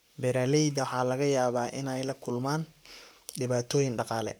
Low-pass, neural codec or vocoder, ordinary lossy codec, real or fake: none; codec, 44.1 kHz, 7.8 kbps, Pupu-Codec; none; fake